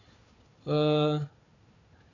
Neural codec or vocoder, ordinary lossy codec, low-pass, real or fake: codec, 16 kHz, 4 kbps, FunCodec, trained on Chinese and English, 50 frames a second; none; 7.2 kHz; fake